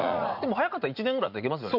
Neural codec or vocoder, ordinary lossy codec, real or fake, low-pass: vocoder, 44.1 kHz, 80 mel bands, Vocos; none; fake; 5.4 kHz